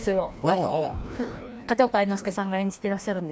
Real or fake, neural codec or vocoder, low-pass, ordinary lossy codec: fake; codec, 16 kHz, 1 kbps, FreqCodec, larger model; none; none